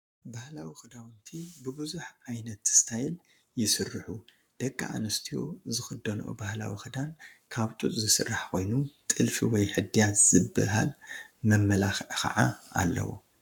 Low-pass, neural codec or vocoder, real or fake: 19.8 kHz; codec, 44.1 kHz, 7.8 kbps, Pupu-Codec; fake